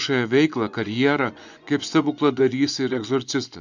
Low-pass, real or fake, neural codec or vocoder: 7.2 kHz; fake; vocoder, 24 kHz, 100 mel bands, Vocos